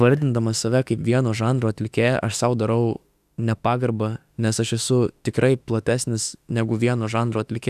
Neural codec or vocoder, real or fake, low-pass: autoencoder, 48 kHz, 32 numbers a frame, DAC-VAE, trained on Japanese speech; fake; 14.4 kHz